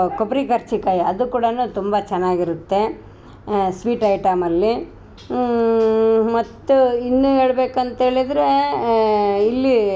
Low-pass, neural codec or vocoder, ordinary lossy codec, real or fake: none; none; none; real